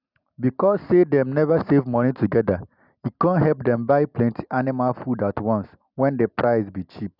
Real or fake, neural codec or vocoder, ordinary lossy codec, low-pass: real; none; none; 5.4 kHz